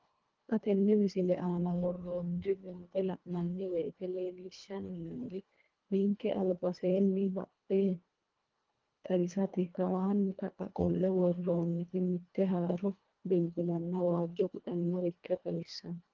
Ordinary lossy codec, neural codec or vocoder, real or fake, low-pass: Opus, 32 kbps; codec, 24 kHz, 1.5 kbps, HILCodec; fake; 7.2 kHz